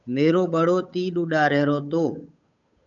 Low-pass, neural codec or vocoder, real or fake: 7.2 kHz; codec, 16 kHz, 8 kbps, FunCodec, trained on Chinese and English, 25 frames a second; fake